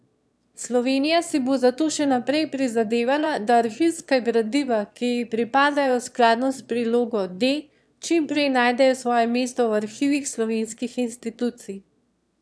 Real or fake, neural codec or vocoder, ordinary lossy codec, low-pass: fake; autoencoder, 22.05 kHz, a latent of 192 numbers a frame, VITS, trained on one speaker; none; none